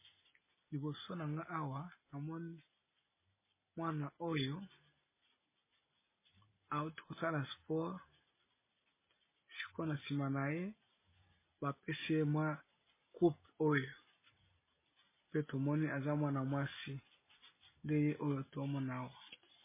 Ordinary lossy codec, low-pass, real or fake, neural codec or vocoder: MP3, 16 kbps; 3.6 kHz; real; none